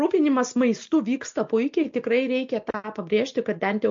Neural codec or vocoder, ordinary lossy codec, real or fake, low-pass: none; MP3, 48 kbps; real; 7.2 kHz